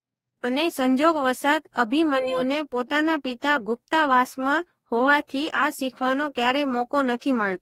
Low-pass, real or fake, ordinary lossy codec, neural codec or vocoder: 19.8 kHz; fake; AAC, 48 kbps; codec, 44.1 kHz, 2.6 kbps, DAC